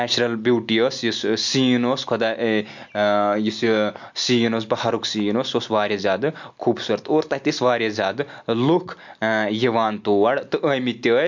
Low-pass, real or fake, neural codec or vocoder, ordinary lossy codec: 7.2 kHz; real; none; MP3, 64 kbps